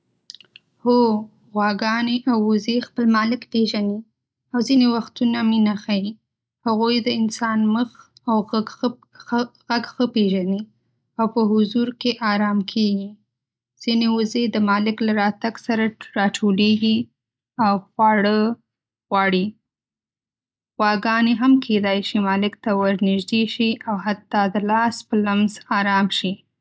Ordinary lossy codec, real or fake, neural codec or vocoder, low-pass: none; real; none; none